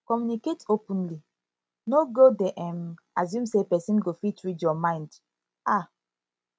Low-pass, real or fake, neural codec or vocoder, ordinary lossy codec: none; real; none; none